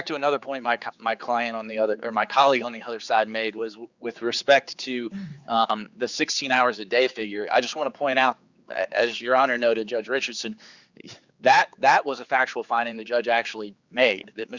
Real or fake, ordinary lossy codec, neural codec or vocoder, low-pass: fake; Opus, 64 kbps; codec, 16 kHz, 4 kbps, X-Codec, HuBERT features, trained on general audio; 7.2 kHz